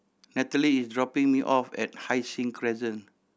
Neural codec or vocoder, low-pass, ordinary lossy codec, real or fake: none; none; none; real